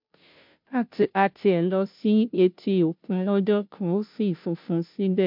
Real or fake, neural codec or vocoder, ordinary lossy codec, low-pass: fake; codec, 16 kHz, 0.5 kbps, FunCodec, trained on Chinese and English, 25 frames a second; MP3, 48 kbps; 5.4 kHz